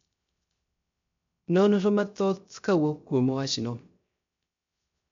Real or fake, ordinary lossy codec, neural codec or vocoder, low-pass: fake; MP3, 48 kbps; codec, 16 kHz, 0.3 kbps, FocalCodec; 7.2 kHz